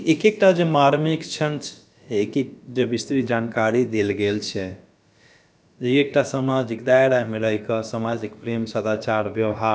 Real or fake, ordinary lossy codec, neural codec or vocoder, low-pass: fake; none; codec, 16 kHz, about 1 kbps, DyCAST, with the encoder's durations; none